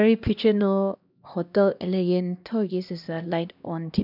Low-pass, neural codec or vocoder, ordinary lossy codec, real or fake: 5.4 kHz; codec, 16 kHz, 1 kbps, X-Codec, HuBERT features, trained on LibriSpeech; none; fake